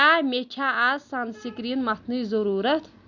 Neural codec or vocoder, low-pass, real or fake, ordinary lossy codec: none; 7.2 kHz; real; none